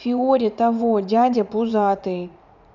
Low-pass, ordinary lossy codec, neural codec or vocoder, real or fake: 7.2 kHz; none; vocoder, 44.1 kHz, 80 mel bands, Vocos; fake